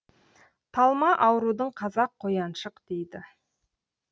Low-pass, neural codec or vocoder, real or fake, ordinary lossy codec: none; none; real; none